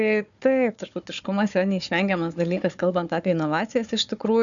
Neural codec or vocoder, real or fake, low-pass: codec, 16 kHz, 4 kbps, FunCodec, trained on Chinese and English, 50 frames a second; fake; 7.2 kHz